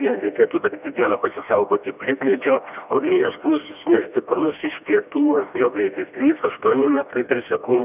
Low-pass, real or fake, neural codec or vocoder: 3.6 kHz; fake; codec, 16 kHz, 1 kbps, FreqCodec, smaller model